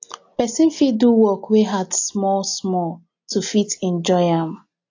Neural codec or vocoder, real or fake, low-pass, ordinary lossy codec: none; real; 7.2 kHz; AAC, 48 kbps